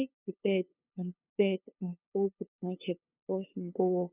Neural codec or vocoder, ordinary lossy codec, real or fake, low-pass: codec, 16 kHz, 2 kbps, FunCodec, trained on LibriTTS, 25 frames a second; none; fake; 3.6 kHz